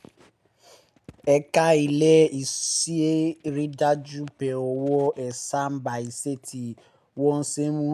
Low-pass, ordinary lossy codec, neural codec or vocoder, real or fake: 14.4 kHz; none; none; real